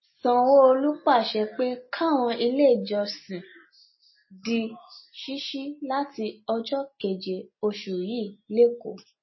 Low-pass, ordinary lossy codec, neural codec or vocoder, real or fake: 7.2 kHz; MP3, 24 kbps; none; real